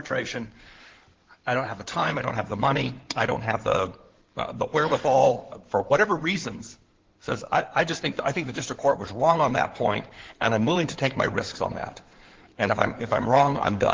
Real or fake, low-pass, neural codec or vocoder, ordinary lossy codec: fake; 7.2 kHz; codec, 16 kHz in and 24 kHz out, 2.2 kbps, FireRedTTS-2 codec; Opus, 16 kbps